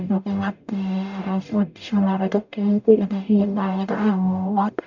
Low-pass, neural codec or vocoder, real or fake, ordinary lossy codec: 7.2 kHz; codec, 44.1 kHz, 0.9 kbps, DAC; fake; none